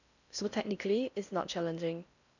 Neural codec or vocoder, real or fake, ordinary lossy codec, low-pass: codec, 16 kHz in and 24 kHz out, 0.6 kbps, FocalCodec, streaming, 4096 codes; fake; none; 7.2 kHz